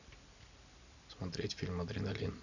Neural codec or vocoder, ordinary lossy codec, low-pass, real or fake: none; none; 7.2 kHz; real